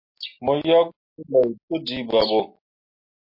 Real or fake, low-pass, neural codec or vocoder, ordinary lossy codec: real; 5.4 kHz; none; MP3, 32 kbps